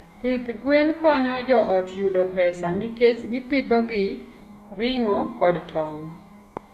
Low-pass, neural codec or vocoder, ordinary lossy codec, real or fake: 14.4 kHz; codec, 44.1 kHz, 2.6 kbps, DAC; none; fake